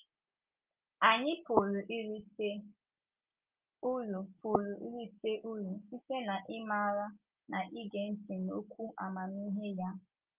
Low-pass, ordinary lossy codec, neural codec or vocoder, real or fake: 3.6 kHz; Opus, 24 kbps; none; real